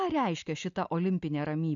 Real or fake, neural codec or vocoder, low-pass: real; none; 7.2 kHz